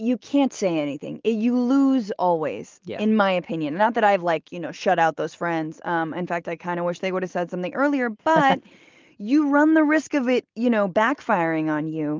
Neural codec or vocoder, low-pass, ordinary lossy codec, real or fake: none; 7.2 kHz; Opus, 24 kbps; real